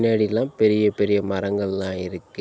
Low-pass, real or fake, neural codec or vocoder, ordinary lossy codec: none; real; none; none